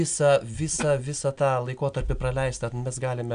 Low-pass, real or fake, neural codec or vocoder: 9.9 kHz; real; none